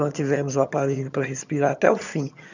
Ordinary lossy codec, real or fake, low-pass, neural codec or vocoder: none; fake; 7.2 kHz; vocoder, 22.05 kHz, 80 mel bands, HiFi-GAN